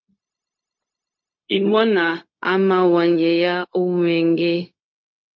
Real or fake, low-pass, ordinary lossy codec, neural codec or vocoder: fake; 7.2 kHz; MP3, 48 kbps; codec, 16 kHz, 0.4 kbps, LongCat-Audio-Codec